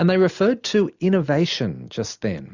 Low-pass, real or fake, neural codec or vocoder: 7.2 kHz; real; none